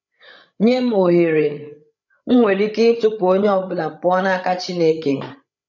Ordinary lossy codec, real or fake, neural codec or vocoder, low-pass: none; fake; codec, 16 kHz, 8 kbps, FreqCodec, larger model; 7.2 kHz